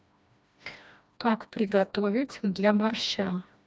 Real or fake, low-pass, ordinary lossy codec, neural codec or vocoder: fake; none; none; codec, 16 kHz, 1 kbps, FreqCodec, smaller model